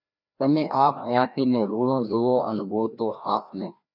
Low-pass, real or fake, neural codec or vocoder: 5.4 kHz; fake; codec, 16 kHz, 1 kbps, FreqCodec, larger model